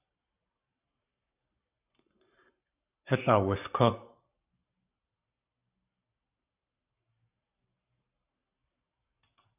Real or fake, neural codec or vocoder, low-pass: fake; codec, 44.1 kHz, 7.8 kbps, Pupu-Codec; 3.6 kHz